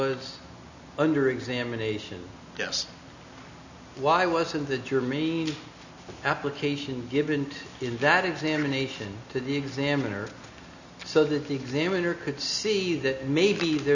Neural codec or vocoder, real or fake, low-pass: none; real; 7.2 kHz